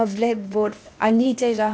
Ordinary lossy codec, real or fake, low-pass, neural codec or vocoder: none; fake; none; codec, 16 kHz, 0.8 kbps, ZipCodec